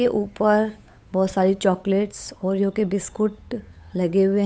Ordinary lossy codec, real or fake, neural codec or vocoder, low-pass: none; fake; codec, 16 kHz, 8 kbps, FunCodec, trained on Chinese and English, 25 frames a second; none